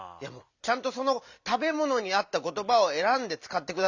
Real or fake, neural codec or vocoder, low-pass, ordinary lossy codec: real; none; 7.2 kHz; none